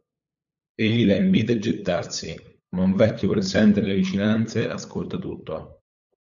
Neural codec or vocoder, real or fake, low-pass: codec, 16 kHz, 8 kbps, FunCodec, trained on LibriTTS, 25 frames a second; fake; 7.2 kHz